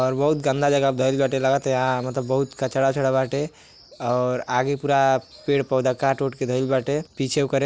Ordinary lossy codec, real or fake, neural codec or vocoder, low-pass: none; real; none; none